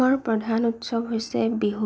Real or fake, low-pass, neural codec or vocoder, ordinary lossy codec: real; none; none; none